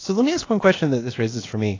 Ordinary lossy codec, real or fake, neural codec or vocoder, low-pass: AAC, 32 kbps; fake; codec, 16 kHz, about 1 kbps, DyCAST, with the encoder's durations; 7.2 kHz